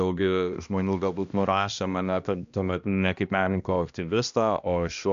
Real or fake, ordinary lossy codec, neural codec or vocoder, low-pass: fake; AAC, 96 kbps; codec, 16 kHz, 1 kbps, X-Codec, HuBERT features, trained on balanced general audio; 7.2 kHz